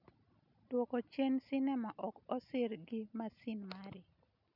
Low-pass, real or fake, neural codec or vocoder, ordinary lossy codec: 5.4 kHz; real; none; none